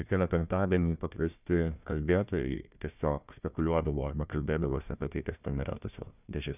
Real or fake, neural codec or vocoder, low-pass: fake; codec, 16 kHz, 1 kbps, FunCodec, trained on Chinese and English, 50 frames a second; 3.6 kHz